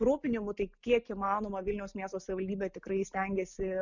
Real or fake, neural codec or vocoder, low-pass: real; none; 7.2 kHz